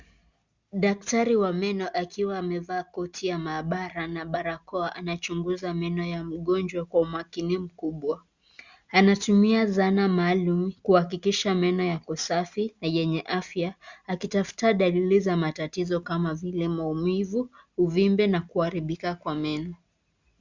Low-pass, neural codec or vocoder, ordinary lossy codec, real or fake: 7.2 kHz; none; Opus, 64 kbps; real